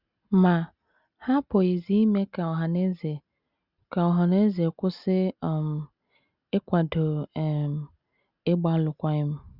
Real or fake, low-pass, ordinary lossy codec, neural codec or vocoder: real; 5.4 kHz; Opus, 64 kbps; none